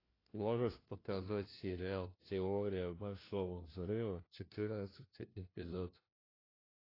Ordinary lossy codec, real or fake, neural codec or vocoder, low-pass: AAC, 24 kbps; fake; codec, 16 kHz, 1 kbps, FunCodec, trained on LibriTTS, 50 frames a second; 5.4 kHz